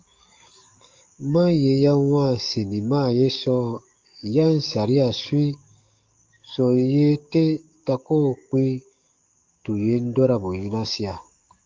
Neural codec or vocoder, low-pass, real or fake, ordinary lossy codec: codec, 44.1 kHz, 7.8 kbps, DAC; 7.2 kHz; fake; Opus, 32 kbps